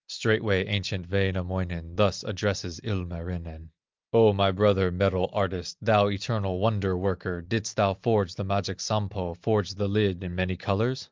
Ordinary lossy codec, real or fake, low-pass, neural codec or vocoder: Opus, 24 kbps; real; 7.2 kHz; none